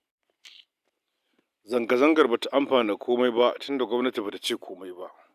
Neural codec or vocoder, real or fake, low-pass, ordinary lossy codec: none; real; 14.4 kHz; none